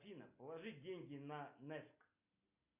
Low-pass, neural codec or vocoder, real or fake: 3.6 kHz; none; real